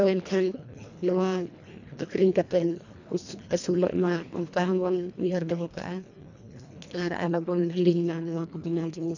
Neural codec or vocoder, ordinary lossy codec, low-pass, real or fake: codec, 24 kHz, 1.5 kbps, HILCodec; none; 7.2 kHz; fake